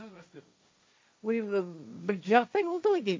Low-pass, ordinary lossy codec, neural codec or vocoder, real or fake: none; none; codec, 16 kHz, 1.1 kbps, Voila-Tokenizer; fake